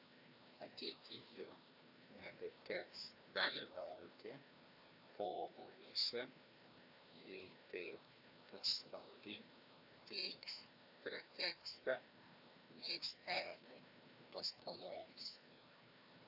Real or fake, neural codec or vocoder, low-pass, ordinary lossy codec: fake; codec, 16 kHz, 1 kbps, FreqCodec, larger model; 5.4 kHz; AAC, 48 kbps